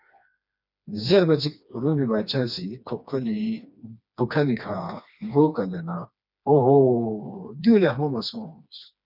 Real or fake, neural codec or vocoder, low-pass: fake; codec, 16 kHz, 2 kbps, FreqCodec, smaller model; 5.4 kHz